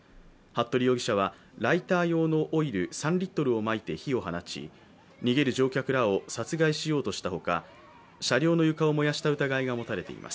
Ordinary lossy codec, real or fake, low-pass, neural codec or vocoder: none; real; none; none